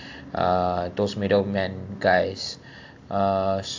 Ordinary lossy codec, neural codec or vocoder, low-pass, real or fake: AAC, 48 kbps; none; 7.2 kHz; real